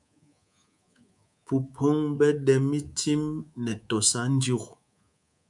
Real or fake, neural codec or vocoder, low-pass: fake; codec, 24 kHz, 3.1 kbps, DualCodec; 10.8 kHz